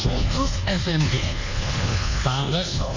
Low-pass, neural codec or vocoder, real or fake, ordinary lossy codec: 7.2 kHz; codec, 24 kHz, 1.2 kbps, DualCodec; fake; AAC, 48 kbps